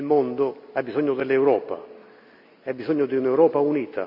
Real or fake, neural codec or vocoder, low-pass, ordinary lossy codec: real; none; 5.4 kHz; none